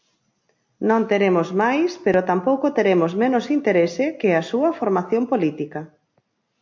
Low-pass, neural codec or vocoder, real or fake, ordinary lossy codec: 7.2 kHz; none; real; MP3, 48 kbps